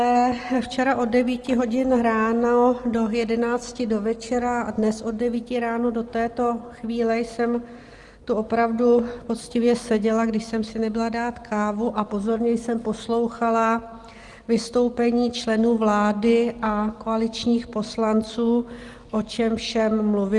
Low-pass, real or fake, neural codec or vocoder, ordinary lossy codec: 10.8 kHz; real; none; Opus, 24 kbps